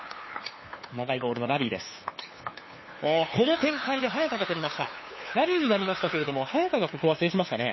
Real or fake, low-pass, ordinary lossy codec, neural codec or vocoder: fake; 7.2 kHz; MP3, 24 kbps; codec, 16 kHz, 2 kbps, FunCodec, trained on LibriTTS, 25 frames a second